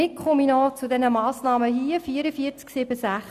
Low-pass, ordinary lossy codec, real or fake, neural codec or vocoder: 14.4 kHz; none; real; none